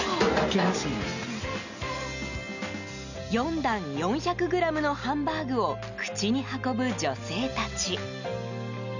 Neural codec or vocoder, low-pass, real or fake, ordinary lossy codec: none; 7.2 kHz; real; none